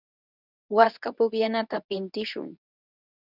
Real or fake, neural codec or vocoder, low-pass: fake; codec, 24 kHz, 0.9 kbps, WavTokenizer, medium speech release version 2; 5.4 kHz